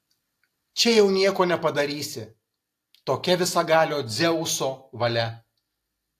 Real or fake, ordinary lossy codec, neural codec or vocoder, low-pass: real; AAC, 64 kbps; none; 14.4 kHz